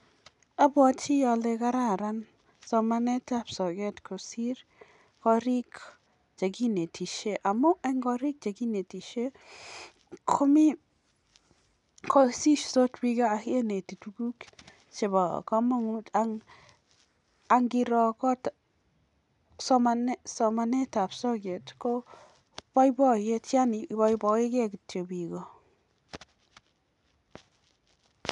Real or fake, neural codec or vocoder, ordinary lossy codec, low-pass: real; none; none; 10.8 kHz